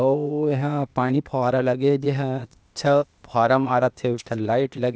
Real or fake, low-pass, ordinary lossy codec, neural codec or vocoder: fake; none; none; codec, 16 kHz, 0.8 kbps, ZipCodec